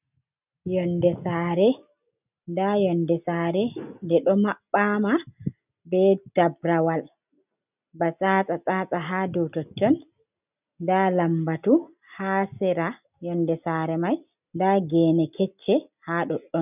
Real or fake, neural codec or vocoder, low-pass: real; none; 3.6 kHz